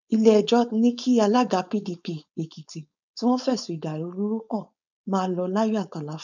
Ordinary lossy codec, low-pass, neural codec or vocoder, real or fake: none; 7.2 kHz; codec, 16 kHz, 4.8 kbps, FACodec; fake